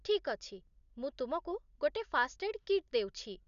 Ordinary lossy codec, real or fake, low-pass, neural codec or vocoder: none; real; 7.2 kHz; none